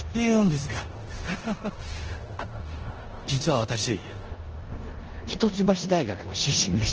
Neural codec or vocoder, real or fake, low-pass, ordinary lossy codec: codec, 16 kHz in and 24 kHz out, 0.9 kbps, LongCat-Audio-Codec, four codebook decoder; fake; 7.2 kHz; Opus, 16 kbps